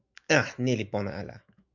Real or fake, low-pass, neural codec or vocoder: fake; 7.2 kHz; autoencoder, 48 kHz, 128 numbers a frame, DAC-VAE, trained on Japanese speech